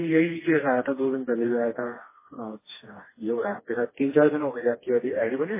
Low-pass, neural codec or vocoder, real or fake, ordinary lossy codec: 3.6 kHz; codec, 16 kHz, 2 kbps, FreqCodec, smaller model; fake; MP3, 16 kbps